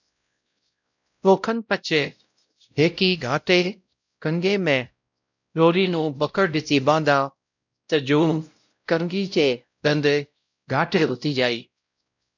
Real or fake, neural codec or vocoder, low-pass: fake; codec, 16 kHz, 0.5 kbps, X-Codec, WavLM features, trained on Multilingual LibriSpeech; 7.2 kHz